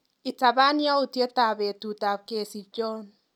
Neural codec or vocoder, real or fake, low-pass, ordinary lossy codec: none; real; 19.8 kHz; none